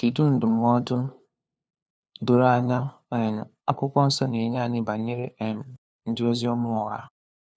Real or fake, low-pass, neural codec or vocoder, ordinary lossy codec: fake; none; codec, 16 kHz, 2 kbps, FunCodec, trained on LibriTTS, 25 frames a second; none